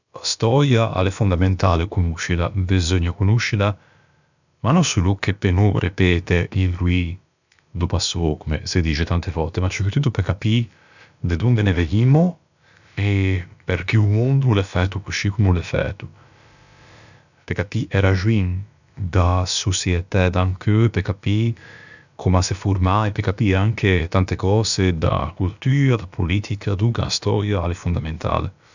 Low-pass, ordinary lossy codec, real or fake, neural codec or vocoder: 7.2 kHz; none; fake; codec, 16 kHz, about 1 kbps, DyCAST, with the encoder's durations